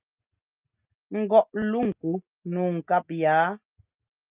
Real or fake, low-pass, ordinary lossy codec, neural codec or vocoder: real; 3.6 kHz; Opus, 24 kbps; none